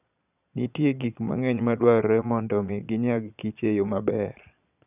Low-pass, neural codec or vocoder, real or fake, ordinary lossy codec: 3.6 kHz; vocoder, 22.05 kHz, 80 mel bands, Vocos; fake; none